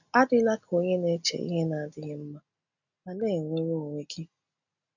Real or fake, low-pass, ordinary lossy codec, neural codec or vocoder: real; 7.2 kHz; none; none